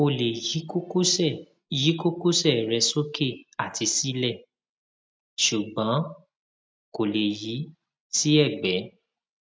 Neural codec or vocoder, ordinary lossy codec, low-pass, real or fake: none; none; none; real